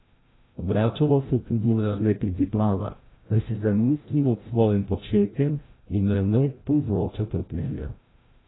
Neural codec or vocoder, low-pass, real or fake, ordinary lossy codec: codec, 16 kHz, 0.5 kbps, FreqCodec, larger model; 7.2 kHz; fake; AAC, 16 kbps